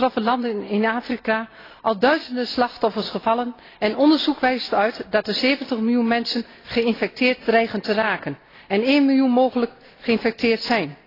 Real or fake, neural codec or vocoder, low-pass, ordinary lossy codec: real; none; 5.4 kHz; AAC, 24 kbps